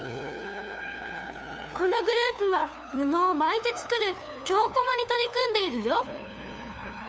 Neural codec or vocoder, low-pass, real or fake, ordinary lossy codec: codec, 16 kHz, 2 kbps, FunCodec, trained on LibriTTS, 25 frames a second; none; fake; none